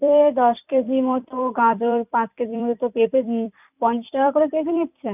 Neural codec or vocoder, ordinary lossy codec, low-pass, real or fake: vocoder, 44.1 kHz, 128 mel bands every 256 samples, BigVGAN v2; none; 3.6 kHz; fake